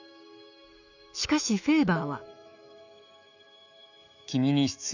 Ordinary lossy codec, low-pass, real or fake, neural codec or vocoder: none; 7.2 kHz; fake; autoencoder, 48 kHz, 128 numbers a frame, DAC-VAE, trained on Japanese speech